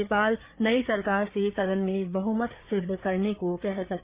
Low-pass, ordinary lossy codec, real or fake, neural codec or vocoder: 3.6 kHz; Opus, 64 kbps; fake; codec, 16 kHz, 4 kbps, FreqCodec, larger model